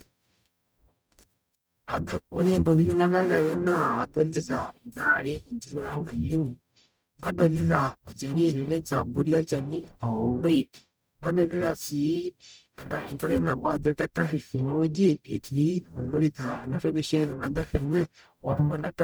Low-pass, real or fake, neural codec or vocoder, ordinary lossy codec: none; fake; codec, 44.1 kHz, 0.9 kbps, DAC; none